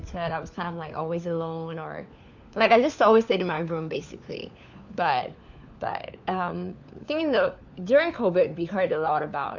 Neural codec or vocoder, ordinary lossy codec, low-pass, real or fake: codec, 16 kHz, 4 kbps, FunCodec, trained on LibriTTS, 50 frames a second; none; 7.2 kHz; fake